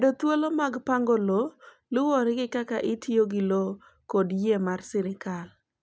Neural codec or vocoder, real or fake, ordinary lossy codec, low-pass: none; real; none; none